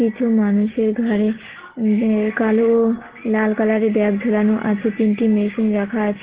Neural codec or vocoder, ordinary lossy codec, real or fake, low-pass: none; Opus, 16 kbps; real; 3.6 kHz